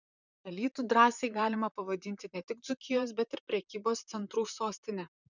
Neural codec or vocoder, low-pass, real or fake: vocoder, 44.1 kHz, 128 mel bands, Pupu-Vocoder; 7.2 kHz; fake